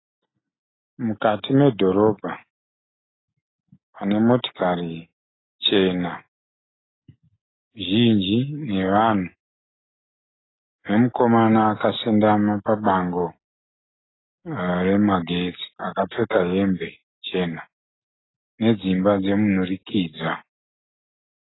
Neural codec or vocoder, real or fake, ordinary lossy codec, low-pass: none; real; AAC, 16 kbps; 7.2 kHz